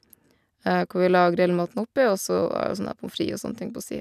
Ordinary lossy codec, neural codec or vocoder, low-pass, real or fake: none; none; 14.4 kHz; real